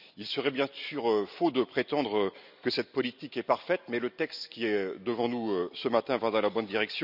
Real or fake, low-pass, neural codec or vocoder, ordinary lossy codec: real; 5.4 kHz; none; none